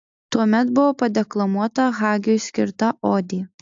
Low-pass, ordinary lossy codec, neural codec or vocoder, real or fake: 7.2 kHz; AAC, 64 kbps; none; real